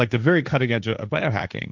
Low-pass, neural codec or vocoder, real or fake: 7.2 kHz; codec, 16 kHz, 1.1 kbps, Voila-Tokenizer; fake